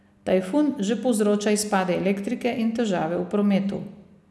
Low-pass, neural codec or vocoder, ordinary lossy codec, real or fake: none; none; none; real